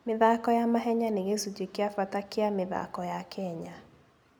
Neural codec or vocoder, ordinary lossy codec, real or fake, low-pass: none; none; real; none